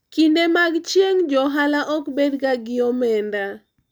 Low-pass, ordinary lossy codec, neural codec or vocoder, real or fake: none; none; none; real